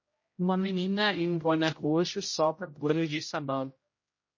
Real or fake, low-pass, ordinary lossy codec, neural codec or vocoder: fake; 7.2 kHz; MP3, 32 kbps; codec, 16 kHz, 0.5 kbps, X-Codec, HuBERT features, trained on general audio